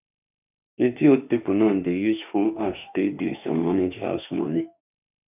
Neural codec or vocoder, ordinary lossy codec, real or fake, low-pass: autoencoder, 48 kHz, 32 numbers a frame, DAC-VAE, trained on Japanese speech; none; fake; 3.6 kHz